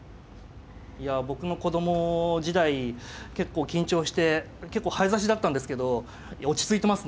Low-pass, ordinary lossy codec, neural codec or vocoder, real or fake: none; none; none; real